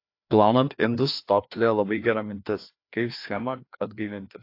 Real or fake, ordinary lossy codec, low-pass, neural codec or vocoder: fake; AAC, 32 kbps; 5.4 kHz; codec, 16 kHz, 2 kbps, FreqCodec, larger model